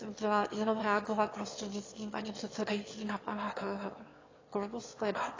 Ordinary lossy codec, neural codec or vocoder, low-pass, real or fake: AAC, 32 kbps; autoencoder, 22.05 kHz, a latent of 192 numbers a frame, VITS, trained on one speaker; 7.2 kHz; fake